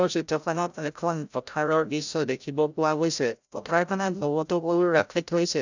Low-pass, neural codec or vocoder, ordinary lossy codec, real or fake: 7.2 kHz; codec, 16 kHz, 0.5 kbps, FreqCodec, larger model; none; fake